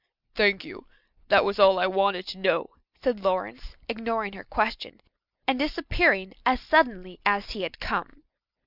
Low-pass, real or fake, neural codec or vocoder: 5.4 kHz; real; none